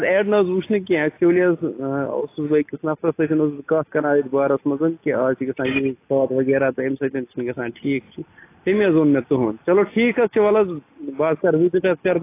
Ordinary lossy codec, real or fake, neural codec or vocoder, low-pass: AAC, 24 kbps; real; none; 3.6 kHz